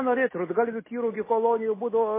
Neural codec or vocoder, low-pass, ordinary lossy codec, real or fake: none; 3.6 kHz; MP3, 16 kbps; real